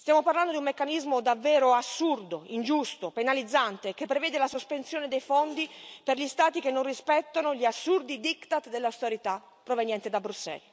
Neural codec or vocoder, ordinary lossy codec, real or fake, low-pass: none; none; real; none